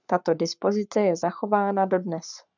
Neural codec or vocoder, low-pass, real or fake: codec, 44.1 kHz, 7.8 kbps, Pupu-Codec; 7.2 kHz; fake